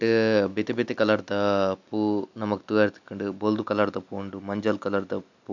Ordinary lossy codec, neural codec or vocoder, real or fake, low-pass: none; none; real; 7.2 kHz